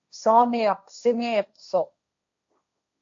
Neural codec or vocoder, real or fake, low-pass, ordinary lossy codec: codec, 16 kHz, 1.1 kbps, Voila-Tokenizer; fake; 7.2 kHz; AAC, 64 kbps